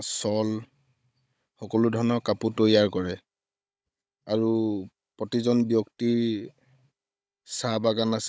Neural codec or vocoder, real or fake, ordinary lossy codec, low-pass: codec, 16 kHz, 16 kbps, FunCodec, trained on Chinese and English, 50 frames a second; fake; none; none